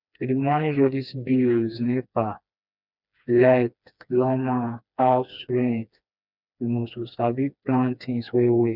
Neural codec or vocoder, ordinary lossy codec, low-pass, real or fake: codec, 16 kHz, 2 kbps, FreqCodec, smaller model; none; 5.4 kHz; fake